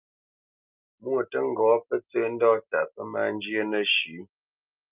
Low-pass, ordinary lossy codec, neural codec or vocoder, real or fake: 3.6 kHz; Opus, 24 kbps; none; real